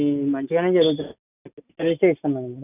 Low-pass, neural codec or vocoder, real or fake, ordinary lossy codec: 3.6 kHz; none; real; none